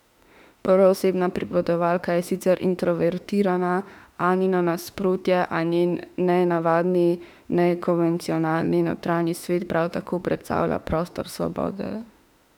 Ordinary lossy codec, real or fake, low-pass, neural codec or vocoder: none; fake; 19.8 kHz; autoencoder, 48 kHz, 32 numbers a frame, DAC-VAE, trained on Japanese speech